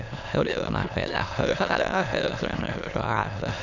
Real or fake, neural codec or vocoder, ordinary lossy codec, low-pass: fake; autoencoder, 22.05 kHz, a latent of 192 numbers a frame, VITS, trained on many speakers; none; 7.2 kHz